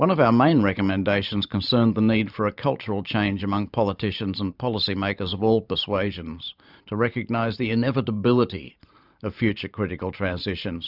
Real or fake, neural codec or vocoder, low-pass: fake; vocoder, 44.1 kHz, 128 mel bands every 512 samples, BigVGAN v2; 5.4 kHz